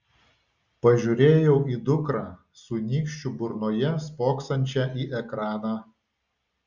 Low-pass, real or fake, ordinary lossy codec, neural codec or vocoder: 7.2 kHz; real; Opus, 64 kbps; none